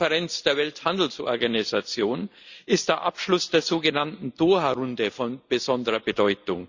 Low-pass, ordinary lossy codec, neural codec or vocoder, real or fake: 7.2 kHz; Opus, 64 kbps; none; real